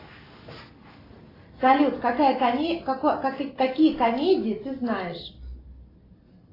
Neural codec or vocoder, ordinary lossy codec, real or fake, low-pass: none; AAC, 24 kbps; real; 5.4 kHz